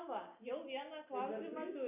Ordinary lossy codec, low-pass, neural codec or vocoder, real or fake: MP3, 32 kbps; 3.6 kHz; none; real